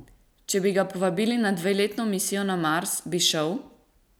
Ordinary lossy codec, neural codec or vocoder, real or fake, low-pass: none; none; real; none